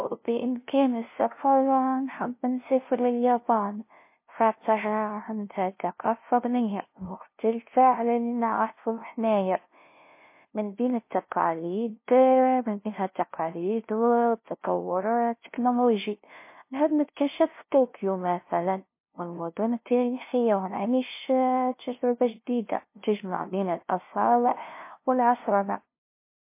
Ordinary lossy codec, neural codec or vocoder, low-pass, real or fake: MP3, 24 kbps; codec, 16 kHz, 0.5 kbps, FunCodec, trained on LibriTTS, 25 frames a second; 3.6 kHz; fake